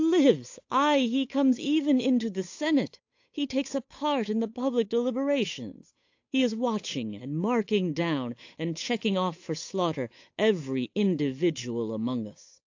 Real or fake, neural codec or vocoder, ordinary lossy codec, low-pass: fake; codec, 16 kHz, 8 kbps, FunCodec, trained on Chinese and English, 25 frames a second; AAC, 48 kbps; 7.2 kHz